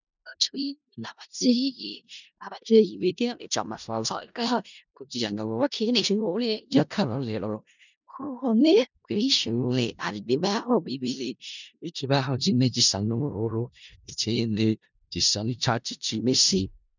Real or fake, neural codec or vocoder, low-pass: fake; codec, 16 kHz in and 24 kHz out, 0.4 kbps, LongCat-Audio-Codec, four codebook decoder; 7.2 kHz